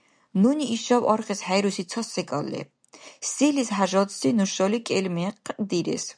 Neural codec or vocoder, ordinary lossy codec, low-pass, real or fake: none; MP3, 96 kbps; 9.9 kHz; real